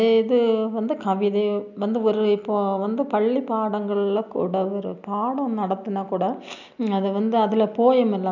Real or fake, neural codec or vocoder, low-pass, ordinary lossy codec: real; none; 7.2 kHz; none